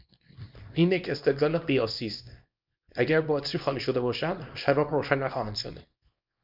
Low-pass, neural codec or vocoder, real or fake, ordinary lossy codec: 5.4 kHz; codec, 24 kHz, 0.9 kbps, WavTokenizer, small release; fake; MP3, 48 kbps